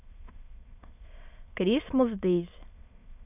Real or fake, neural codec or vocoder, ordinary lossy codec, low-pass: fake; autoencoder, 22.05 kHz, a latent of 192 numbers a frame, VITS, trained on many speakers; none; 3.6 kHz